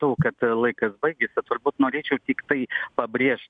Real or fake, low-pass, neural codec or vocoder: real; 9.9 kHz; none